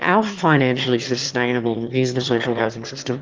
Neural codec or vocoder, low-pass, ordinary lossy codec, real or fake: autoencoder, 22.05 kHz, a latent of 192 numbers a frame, VITS, trained on one speaker; 7.2 kHz; Opus, 32 kbps; fake